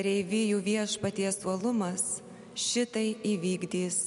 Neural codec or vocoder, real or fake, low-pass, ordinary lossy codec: none; real; 10.8 kHz; MP3, 64 kbps